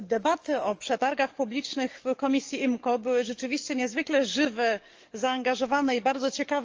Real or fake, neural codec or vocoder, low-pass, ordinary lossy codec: fake; codec, 44.1 kHz, 7.8 kbps, DAC; 7.2 kHz; Opus, 24 kbps